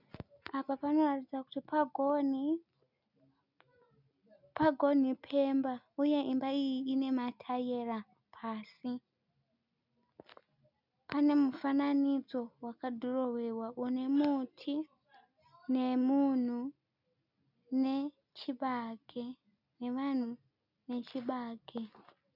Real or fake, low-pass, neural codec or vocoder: real; 5.4 kHz; none